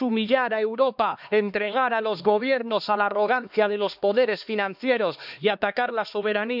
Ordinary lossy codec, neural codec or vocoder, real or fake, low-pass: none; codec, 16 kHz, 2 kbps, X-Codec, HuBERT features, trained on LibriSpeech; fake; 5.4 kHz